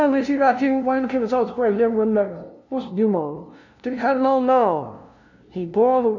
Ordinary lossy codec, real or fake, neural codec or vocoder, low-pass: none; fake; codec, 16 kHz, 0.5 kbps, FunCodec, trained on LibriTTS, 25 frames a second; 7.2 kHz